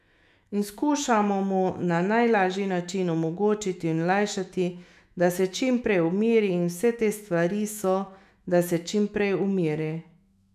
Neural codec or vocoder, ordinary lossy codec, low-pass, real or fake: autoencoder, 48 kHz, 128 numbers a frame, DAC-VAE, trained on Japanese speech; none; 14.4 kHz; fake